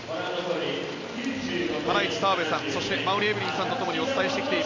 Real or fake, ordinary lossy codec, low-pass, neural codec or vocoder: real; AAC, 32 kbps; 7.2 kHz; none